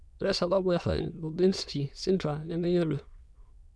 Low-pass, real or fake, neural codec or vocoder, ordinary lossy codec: none; fake; autoencoder, 22.05 kHz, a latent of 192 numbers a frame, VITS, trained on many speakers; none